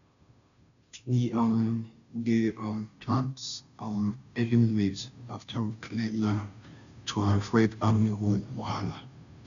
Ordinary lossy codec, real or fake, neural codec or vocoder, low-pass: none; fake; codec, 16 kHz, 0.5 kbps, FunCodec, trained on Chinese and English, 25 frames a second; 7.2 kHz